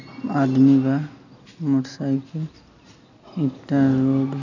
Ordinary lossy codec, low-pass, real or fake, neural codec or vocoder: none; 7.2 kHz; real; none